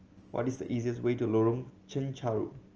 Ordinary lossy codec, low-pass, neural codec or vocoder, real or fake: Opus, 24 kbps; 7.2 kHz; none; real